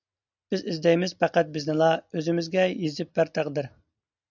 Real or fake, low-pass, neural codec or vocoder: real; 7.2 kHz; none